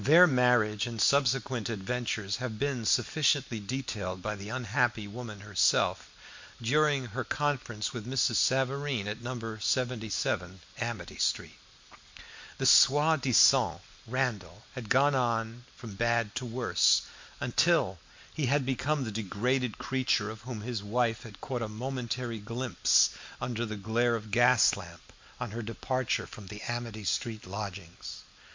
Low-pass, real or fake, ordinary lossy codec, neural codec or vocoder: 7.2 kHz; real; MP3, 48 kbps; none